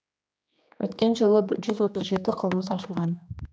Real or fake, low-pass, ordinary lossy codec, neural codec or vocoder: fake; none; none; codec, 16 kHz, 2 kbps, X-Codec, HuBERT features, trained on general audio